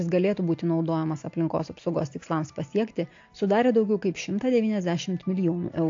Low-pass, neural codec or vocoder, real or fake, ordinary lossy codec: 7.2 kHz; none; real; AAC, 48 kbps